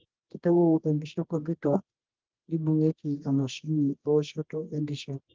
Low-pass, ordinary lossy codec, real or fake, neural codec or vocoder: 7.2 kHz; Opus, 16 kbps; fake; codec, 24 kHz, 0.9 kbps, WavTokenizer, medium music audio release